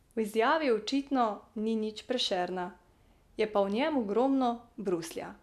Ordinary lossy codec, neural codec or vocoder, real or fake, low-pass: none; none; real; 14.4 kHz